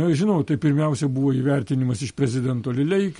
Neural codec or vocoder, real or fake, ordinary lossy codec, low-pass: none; real; MP3, 48 kbps; 19.8 kHz